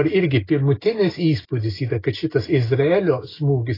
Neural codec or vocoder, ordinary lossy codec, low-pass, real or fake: none; AAC, 24 kbps; 5.4 kHz; real